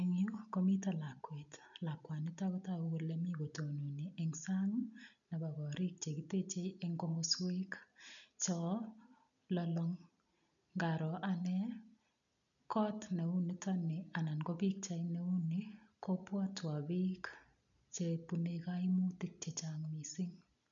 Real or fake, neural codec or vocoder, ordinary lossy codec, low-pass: real; none; none; 7.2 kHz